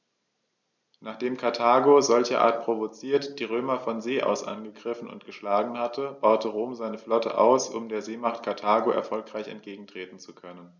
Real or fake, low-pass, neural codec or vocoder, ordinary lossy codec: real; 7.2 kHz; none; none